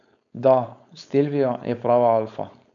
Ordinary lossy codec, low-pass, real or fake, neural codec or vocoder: none; 7.2 kHz; fake; codec, 16 kHz, 4.8 kbps, FACodec